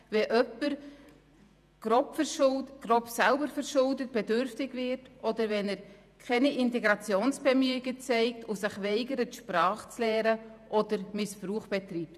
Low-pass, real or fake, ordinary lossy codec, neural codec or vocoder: 14.4 kHz; fake; none; vocoder, 48 kHz, 128 mel bands, Vocos